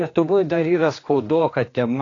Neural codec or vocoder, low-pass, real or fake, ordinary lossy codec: codec, 16 kHz, 2 kbps, X-Codec, HuBERT features, trained on general audio; 7.2 kHz; fake; AAC, 32 kbps